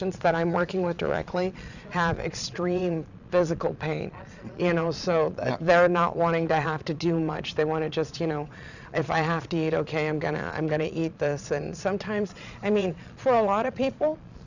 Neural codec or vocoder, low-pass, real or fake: vocoder, 22.05 kHz, 80 mel bands, Vocos; 7.2 kHz; fake